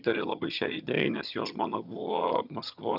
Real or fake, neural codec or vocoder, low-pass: fake; vocoder, 22.05 kHz, 80 mel bands, HiFi-GAN; 5.4 kHz